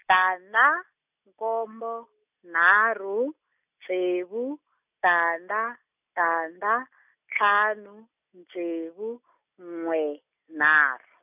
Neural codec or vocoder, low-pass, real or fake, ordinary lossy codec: none; 3.6 kHz; real; none